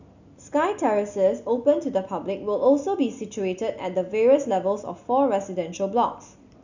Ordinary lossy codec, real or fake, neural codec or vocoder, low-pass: none; real; none; 7.2 kHz